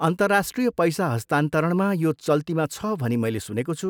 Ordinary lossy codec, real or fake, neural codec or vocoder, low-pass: none; real; none; none